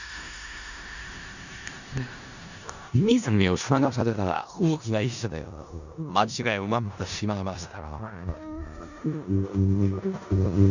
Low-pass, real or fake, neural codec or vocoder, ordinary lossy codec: 7.2 kHz; fake; codec, 16 kHz in and 24 kHz out, 0.4 kbps, LongCat-Audio-Codec, four codebook decoder; none